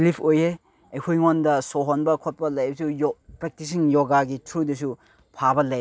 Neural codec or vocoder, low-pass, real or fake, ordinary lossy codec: none; none; real; none